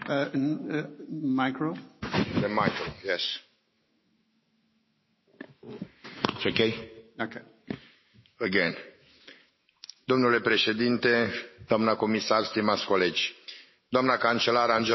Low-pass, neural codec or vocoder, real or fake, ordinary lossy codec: 7.2 kHz; autoencoder, 48 kHz, 128 numbers a frame, DAC-VAE, trained on Japanese speech; fake; MP3, 24 kbps